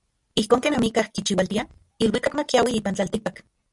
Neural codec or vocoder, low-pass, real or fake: none; 10.8 kHz; real